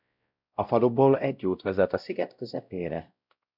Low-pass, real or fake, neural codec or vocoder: 5.4 kHz; fake; codec, 16 kHz, 0.5 kbps, X-Codec, WavLM features, trained on Multilingual LibriSpeech